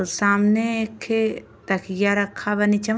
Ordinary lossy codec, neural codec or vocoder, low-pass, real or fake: none; none; none; real